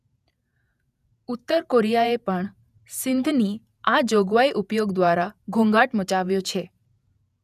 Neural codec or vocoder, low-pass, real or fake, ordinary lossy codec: vocoder, 48 kHz, 128 mel bands, Vocos; 14.4 kHz; fake; none